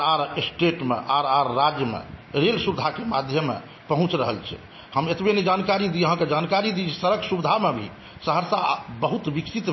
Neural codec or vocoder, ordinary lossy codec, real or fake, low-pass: none; MP3, 24 kbps; real; 7.2 kHz